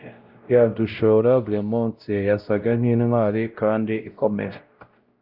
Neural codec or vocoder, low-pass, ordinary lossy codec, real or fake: codec, 16 kHz, 0.5 kbps, X-Codec, WavLM features, trained on Multilingual LibriSpeech; 5.4 kHz; Opus, 32 kbps; fake